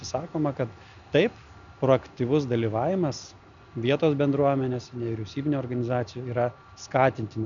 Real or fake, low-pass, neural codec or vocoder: real; 7.2 kHz; none